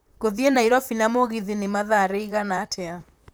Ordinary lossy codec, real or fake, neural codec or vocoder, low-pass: none; fake; vocoder, 44.1 kHz, 128 mel bands, Pupu-Vocoder; none